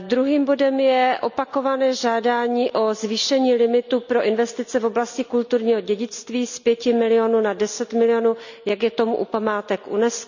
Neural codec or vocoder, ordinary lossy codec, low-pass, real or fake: none; none; 7.2 kHz; real